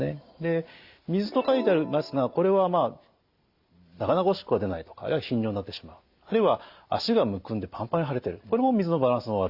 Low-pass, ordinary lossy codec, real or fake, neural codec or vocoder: 5.4 kHz; Opus, 64 kbps; real; none